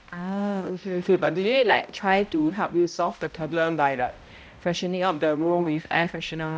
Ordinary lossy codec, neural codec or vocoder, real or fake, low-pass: none; codec, 16 kHz, 0.5 kbps, X-Codec, HuBERT features, trained on balanced general audio; fake; none